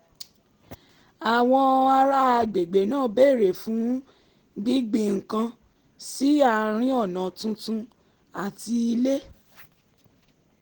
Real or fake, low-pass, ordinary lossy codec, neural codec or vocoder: real; 19.8 kHz; Opus, 16 kbps; none